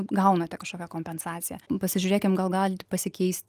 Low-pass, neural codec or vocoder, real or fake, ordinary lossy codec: 14.4 kHz; none; real; Opus, 32 kbps